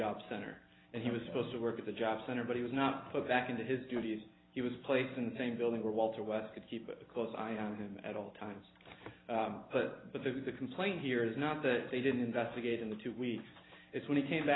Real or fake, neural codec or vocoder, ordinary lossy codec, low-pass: real; none; AAC, 16 kbps; 7.2 kHz